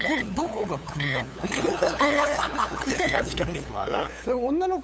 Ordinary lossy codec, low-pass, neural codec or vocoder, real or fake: none; none; codec, 16 kHz, 8 kbps, FunCodec, trained on LibriTTS, 25 frames a second; fake